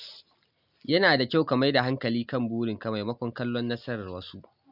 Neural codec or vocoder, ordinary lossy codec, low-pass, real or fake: none; none; 5.4 kHz; real